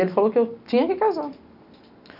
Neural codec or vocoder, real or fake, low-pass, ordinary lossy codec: none; real; 5.4 kHz; none